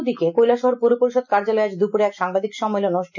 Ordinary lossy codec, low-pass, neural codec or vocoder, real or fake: none; 7.2 kHz; none; real